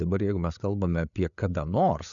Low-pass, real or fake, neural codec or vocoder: 7.2 kHz; fake; codec, 16 kHz, 8 kbps, FreqCodec, larger model